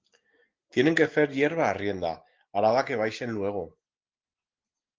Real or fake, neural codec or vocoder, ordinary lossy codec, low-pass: real; none; Opus, 16 kbps; 7.2 kHz